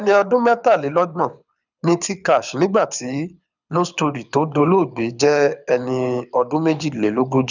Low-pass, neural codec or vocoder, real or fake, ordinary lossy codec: 7.2 kHz; codec, 24 kHz, 6 kbps, HILCodec; fake; none